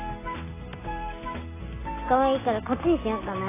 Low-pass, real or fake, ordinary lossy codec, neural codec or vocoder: 3.6 kHz; real; AAC, 16 kbps; none